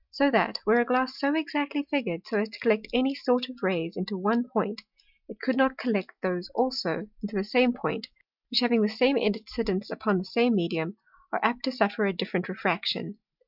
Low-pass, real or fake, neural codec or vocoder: 5.4 kHz; real; none